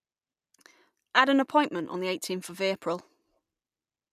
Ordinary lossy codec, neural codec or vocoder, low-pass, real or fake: none; none; 14.4 kHz; real